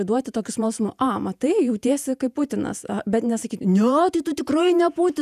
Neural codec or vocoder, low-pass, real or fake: vocoder, 48 kHz, 128 mel bands, Vocos; 14.4 kHz; fake